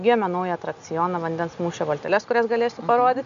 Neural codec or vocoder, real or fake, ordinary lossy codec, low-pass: none; real; AAC, 96 kbps; 7.2 kHz